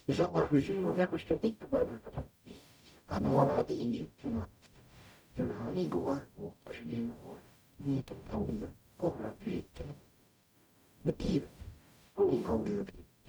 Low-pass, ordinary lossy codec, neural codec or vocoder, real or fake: none; none; codec, 44.1 kHz, 0.9 kbps, DAC; fake